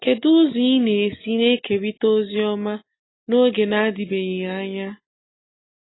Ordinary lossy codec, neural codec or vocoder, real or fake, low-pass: AAC, 16 kbps; none; real; 7.2 kHz